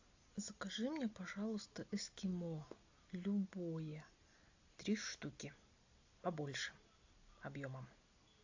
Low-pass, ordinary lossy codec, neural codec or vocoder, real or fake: 7.2 kHz; AAC, 48 kbps; none; real